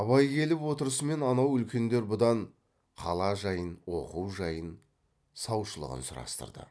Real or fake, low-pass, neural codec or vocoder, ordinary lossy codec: real; none; none; none